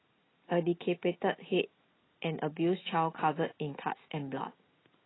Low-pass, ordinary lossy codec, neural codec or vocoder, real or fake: 7.2 kHz; AAC, 16 kbps; none; real